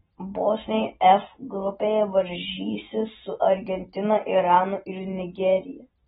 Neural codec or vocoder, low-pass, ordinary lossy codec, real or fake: none; 19.8 kHz; AAC, 16 kbps; real